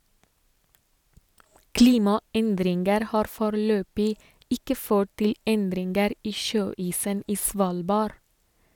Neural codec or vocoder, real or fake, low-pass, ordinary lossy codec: none; real; 19.8 kHz; none